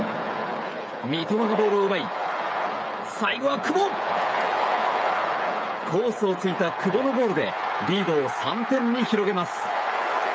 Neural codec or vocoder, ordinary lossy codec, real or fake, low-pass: codec, 16 kHz, 8 kbps, FreqCodec, smaller model; none; fake; none